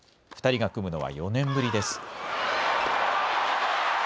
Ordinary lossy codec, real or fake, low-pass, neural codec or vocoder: none; real; none; none